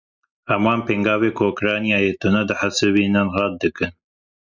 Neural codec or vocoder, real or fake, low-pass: none; real; 7.2 kHz